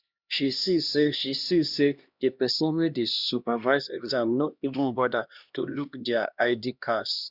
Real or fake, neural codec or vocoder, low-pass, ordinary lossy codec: fake; codec, 16 kHz, 1 kbps, X-Codec, HuBERT features, trained on LibriSpeech; 5.4 kHz; Opus, 64 kbps